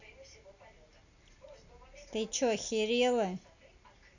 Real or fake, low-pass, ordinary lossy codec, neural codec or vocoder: real; 7.2 kHz; none; none